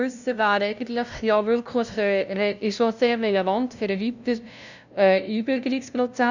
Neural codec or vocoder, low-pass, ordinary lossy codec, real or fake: codec, 16 kHz, 0.5 kbps, FunCodec, trained on LibriTTS, 25 frames a second; 7.2 kHz; none; fake